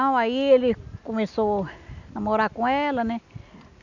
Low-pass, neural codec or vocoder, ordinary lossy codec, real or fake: 7.2 kHz; none; none; real